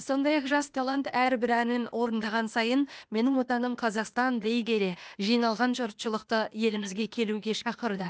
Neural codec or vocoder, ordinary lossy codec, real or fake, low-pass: codec, 16 kHz, 0.8 kbps, ZipCodec; none; fake; none